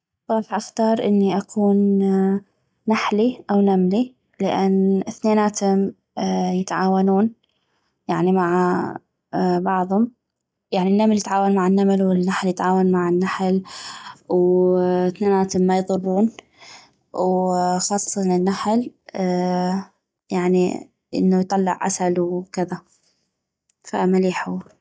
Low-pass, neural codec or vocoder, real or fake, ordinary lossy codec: none; none; real; none